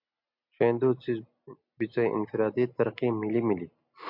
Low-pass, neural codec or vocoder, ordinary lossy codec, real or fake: 5.4 kHz; none; MP3, 48 kbps; real